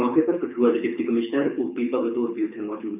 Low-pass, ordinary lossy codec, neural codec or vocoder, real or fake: 3.6 kHz; none; codec, 24 kHz, 6 kbps, HILCodec; fake